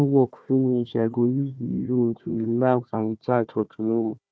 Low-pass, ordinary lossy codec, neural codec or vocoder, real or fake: none; none; codec, 16 kHz, 1 kbps, FunCodec, trained on Chinese and English, 50 frames a second; fake